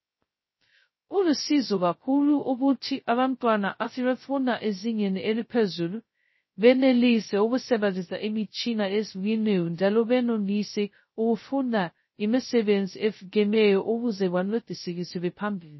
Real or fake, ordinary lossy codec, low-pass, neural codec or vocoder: fake; MP3, 24 kbps; 7.2 kHz; codec, 16 kHz, 0.2 kbps, FocalCodec